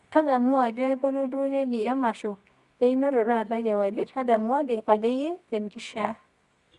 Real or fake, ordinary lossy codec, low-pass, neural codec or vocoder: fake; Opus, 64 kbps; 10.8 kHz; codec, 24 kHz, 0.9 kbps, WavTokenizer, medium music audio release